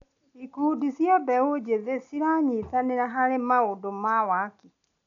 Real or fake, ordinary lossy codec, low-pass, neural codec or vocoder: real; none; 7.2 kHz; none